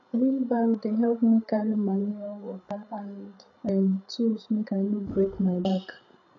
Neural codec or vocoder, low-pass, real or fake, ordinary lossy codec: codec, 16 kHz, 8 kbps, FreqCodec, larger model; 7.2 kHz; fake; none